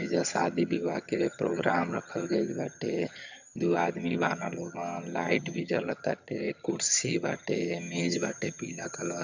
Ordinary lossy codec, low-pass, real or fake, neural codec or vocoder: none; 7.2 kHz; fake; vocoder, 22.05 kHz, 80 mel bands, HiFi-GAN